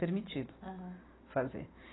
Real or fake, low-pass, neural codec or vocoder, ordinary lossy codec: real; 7.2 kHz; none; AAC, 16 kbps